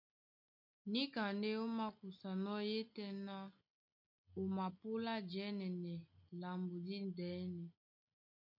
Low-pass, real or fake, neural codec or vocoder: 5.4 kHz; real; none